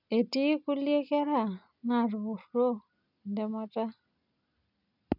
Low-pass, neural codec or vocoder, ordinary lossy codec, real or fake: 5.4 kHz; none; none; real